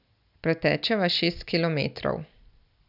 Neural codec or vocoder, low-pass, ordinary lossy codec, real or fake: none; 5.4 kHz; none; real